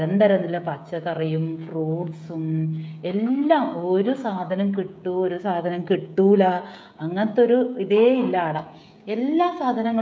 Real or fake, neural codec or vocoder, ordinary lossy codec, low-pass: fake; codec, 16 kHz, 16 kbps, FreqCodec, smaller model; none; none